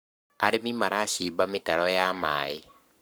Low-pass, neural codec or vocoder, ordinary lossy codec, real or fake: none; codec, 44.1 kHz, 7.8 kbps, Pupu-Codec; none; fake